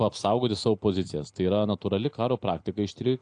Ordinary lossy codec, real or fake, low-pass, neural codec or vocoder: AAC, 64 kbps; real; 9.9 kHz; none